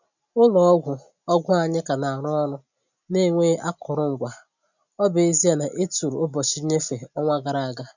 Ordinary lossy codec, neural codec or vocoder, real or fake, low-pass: none; none; real; 7.2 kHz